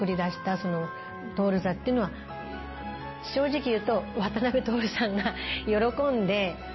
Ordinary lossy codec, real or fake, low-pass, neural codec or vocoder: MP3, 24 kbps; real; 7.2 kHz; none